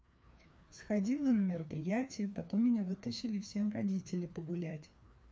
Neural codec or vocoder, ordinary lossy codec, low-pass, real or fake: codec, 16 kHz, 2 kbps, FreqCodec, larger model; none; none; fake